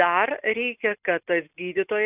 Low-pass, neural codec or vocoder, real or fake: 3.6 kHz; none; real